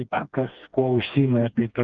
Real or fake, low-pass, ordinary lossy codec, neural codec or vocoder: fake; 7.2 kHz; Opus, 32 kbps; codec, 16 kHz, 2 kbps, FreqCodec, smaller model